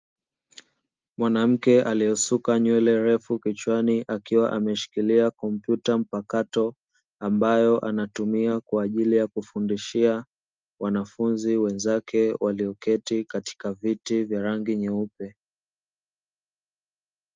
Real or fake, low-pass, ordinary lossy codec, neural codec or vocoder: real; 7.2 kHz; Opus, 24 kbps; none